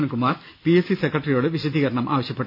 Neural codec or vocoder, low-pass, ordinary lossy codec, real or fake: none; 5.4 kHz; none; real